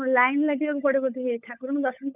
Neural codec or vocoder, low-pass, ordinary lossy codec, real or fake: codec, 16 kHz, 16 kbps, FunCodec, trained on LibriTTS, 50 frames a second; 3.6 kHz; none; fake